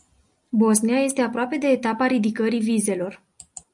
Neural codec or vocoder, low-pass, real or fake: none; 10.8 kHz; real